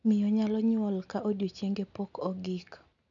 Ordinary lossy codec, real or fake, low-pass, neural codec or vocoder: none; real; 7.2 kHz; none